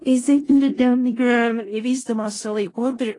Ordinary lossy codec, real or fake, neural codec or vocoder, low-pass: AAC, 32 kbps; fake; codec, 16 kHz in and 24 kHz out, 0.4 kbps, LongCat-Audio-Codec, four codebook decoder; 10.8 kHz